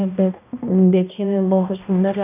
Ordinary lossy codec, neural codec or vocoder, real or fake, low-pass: none; codec, 16 kHz, 1 kbps, X-Codec, HuBERT features, trained on balanced general audio; fake; 3.6 kHz